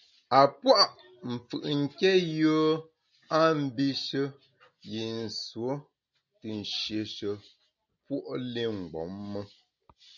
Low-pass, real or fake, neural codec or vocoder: 7.2 kHz; real; none